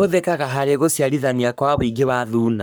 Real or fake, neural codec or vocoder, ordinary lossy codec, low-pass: fake; codec, 44.1 kHz, 3.4 kbps, Pupu-Codec; none; none